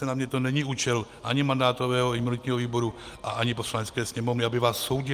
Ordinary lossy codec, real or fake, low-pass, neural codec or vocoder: Opus, 32 kbps; real; 14.4 kHz; none